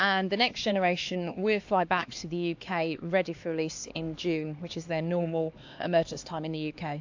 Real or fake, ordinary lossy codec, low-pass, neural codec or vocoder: fake; AAC, 48 kbps; 7.2 kHz; codec, 16 kHz, 2 kbps, X-Codec, HuBERT features, trained on LibriSpeech